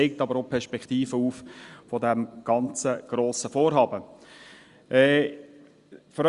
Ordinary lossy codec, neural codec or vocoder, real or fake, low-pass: Opus, 64 kbps; none; real; 10.8 kHz